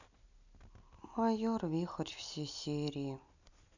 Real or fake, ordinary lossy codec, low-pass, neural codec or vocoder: real; none; 7.2 kHz; none